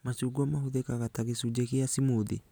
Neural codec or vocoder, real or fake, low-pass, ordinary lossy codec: none; real; none; none